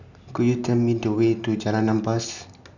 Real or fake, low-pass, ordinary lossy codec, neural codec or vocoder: real; 7.2 kHz; none; none